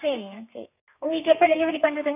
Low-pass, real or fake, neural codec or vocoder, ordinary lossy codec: 3.6 kHz; fake; codec, 32 kHz, 1.9 kbps, SNAC; none